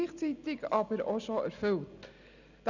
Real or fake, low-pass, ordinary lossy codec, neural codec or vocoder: real; 7.2 kHz; none; none